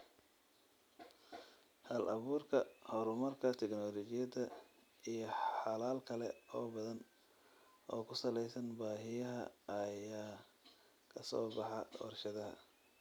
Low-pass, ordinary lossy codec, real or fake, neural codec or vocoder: none; none; real; none